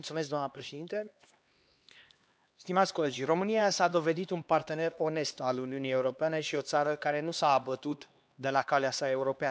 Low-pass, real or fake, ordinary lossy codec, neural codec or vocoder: none; fake; none; codec, 16 kHz, 2 kbps, X-Codec, HuBERT features, trained on LibriSpeech